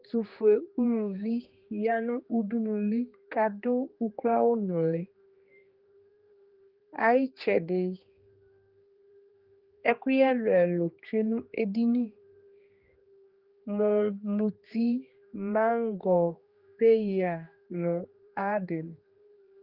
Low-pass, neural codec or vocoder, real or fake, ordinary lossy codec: 5.4 kHz; codec, 16 kHz, 2 kbps, X-Codec, HuBERT features, trained on general audio; fake; Opus, 32 kbps